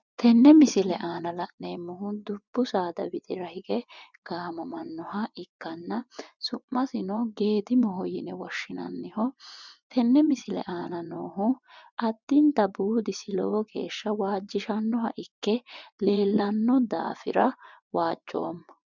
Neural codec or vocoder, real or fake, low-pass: vocoder, 24 kHz, 100 mel bands, Vocos; fake; 7.2 kHz